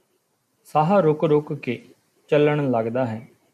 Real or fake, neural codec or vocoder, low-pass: real; none; 14.4 kHz